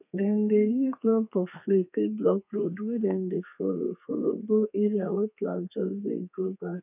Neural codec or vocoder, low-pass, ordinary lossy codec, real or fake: codec, 32 kHz, 1.9 kbps, SNAC; 3.6 kHz; none; fake